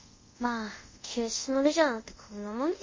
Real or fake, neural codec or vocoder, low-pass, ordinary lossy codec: fake; codec, 24 kHz, 0.5 kbps, DualCodec; 7.2 kHz; MP3, 32 kbps